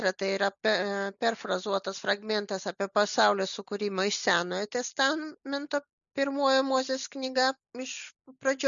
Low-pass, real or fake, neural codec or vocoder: 7.2 kHz; real; none